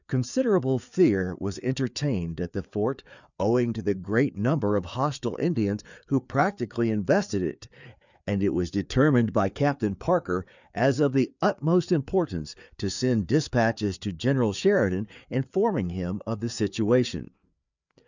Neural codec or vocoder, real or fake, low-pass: codec, 16 kHz, 4 kbps, FreqCodec, larger model; fake; 7.2 kHz